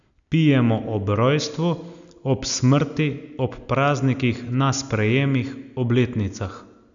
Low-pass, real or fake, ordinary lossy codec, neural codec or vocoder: 7.2 kHz; real; none; none